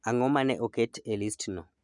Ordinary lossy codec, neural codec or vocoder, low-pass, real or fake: none; none; 10.8 kHz; real